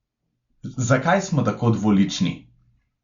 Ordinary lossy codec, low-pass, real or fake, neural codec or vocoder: Opus, 64 kbps; 7.2 kHz; real; none